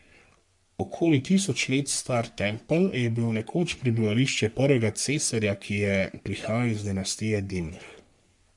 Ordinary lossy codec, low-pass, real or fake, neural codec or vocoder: MP3, 64 kbps; 10.8 kHz; fake; codec, 44.1 kHz, 3.4 kbps, Pupu-Codec